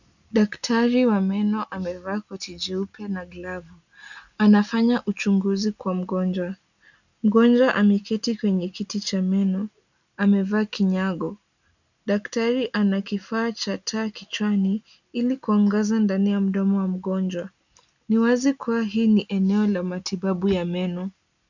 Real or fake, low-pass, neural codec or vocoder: real; 7.2 kHz; none